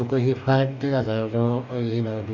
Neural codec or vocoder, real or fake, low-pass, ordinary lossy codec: codec, 44.1 kHz, 2.6 kbps, DAC; fake; 7.2 kHz; none